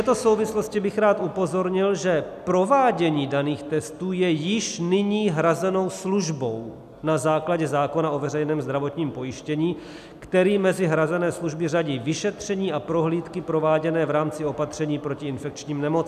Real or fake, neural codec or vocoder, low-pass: real; none; 14.4 kHz